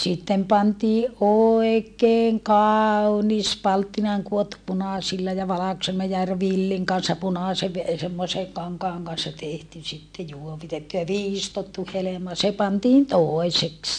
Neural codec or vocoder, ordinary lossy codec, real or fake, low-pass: none; MP3, 64 kbps; real; 9.9 kHz